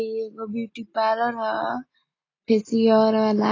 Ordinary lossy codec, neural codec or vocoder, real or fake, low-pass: AAC, 32 kbps; none; real; 7.2 kHz